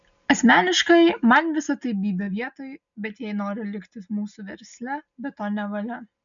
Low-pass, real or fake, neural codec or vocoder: 7.2 kHz; real; none